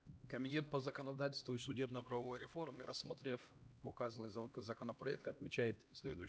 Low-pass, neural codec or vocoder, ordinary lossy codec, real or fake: none; codec, 16 kHz, 1 kbps, X-Codec, HuBERT features, trained on LibriSpeech; none; fake